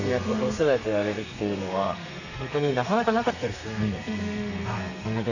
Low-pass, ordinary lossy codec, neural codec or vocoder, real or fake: 7.2 kHz; none; codec, 32 kHz, 1.9 kbps, SNAC; fake